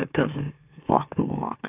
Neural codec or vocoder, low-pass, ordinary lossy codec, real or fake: autoencoder, 44.1 kHz, a latent of 192 numbers a frame, MeloTTS; 3.6 kHz; AAC, 32 kbps; fake